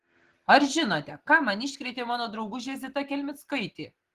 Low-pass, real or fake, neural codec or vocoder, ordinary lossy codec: 14.4 kHz; real; none; Opus, 16 kbps